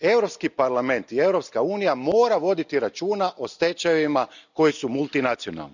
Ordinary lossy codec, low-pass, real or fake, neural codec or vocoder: none; 7.2 kHz; real; none